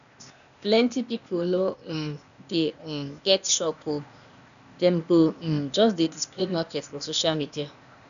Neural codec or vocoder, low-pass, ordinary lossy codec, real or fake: codec, 16 kHz, 0.8 kbps, ZipCodec; 7.2 kHz; none; fake